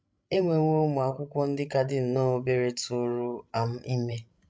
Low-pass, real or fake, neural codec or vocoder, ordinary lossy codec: none; fake; codec, 16 kHz, 16 kbps, FreqCodec, larger model; none